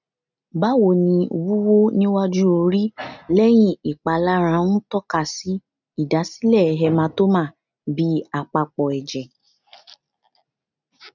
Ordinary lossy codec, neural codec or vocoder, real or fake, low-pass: none; none; real; 7.2 kHz